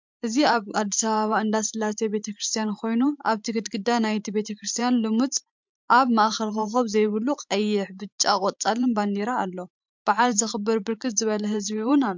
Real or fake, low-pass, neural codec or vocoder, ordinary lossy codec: fake; 7.2 kHz; vocoder, 44.1 kHz, 128 mel bands every 512 samples, BigVGAN v2; MP3, 64 kbps